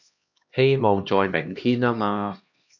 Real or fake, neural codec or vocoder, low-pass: fake; codec, 16 kHz, 1 kbps, X-Codec, HuBERT features, trained on LibriSpeech; 7.2 kHz